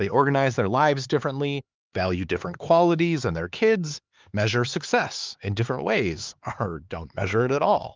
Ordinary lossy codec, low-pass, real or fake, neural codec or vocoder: Opus, 32 kbps; 7.2 kHz; fake; codec, 16 kHz, 4 kbps, X-Codec, HuBERT features, trained on LibriSpeech